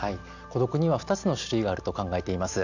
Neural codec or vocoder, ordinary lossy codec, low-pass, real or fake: none; none; 7.2 kHz; real